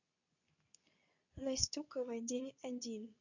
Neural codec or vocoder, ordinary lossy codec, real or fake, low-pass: codec, 24 kHz, 0.9 kbps, WavTokenizer, medium speech release version 2; none; fake; 7.2 kHz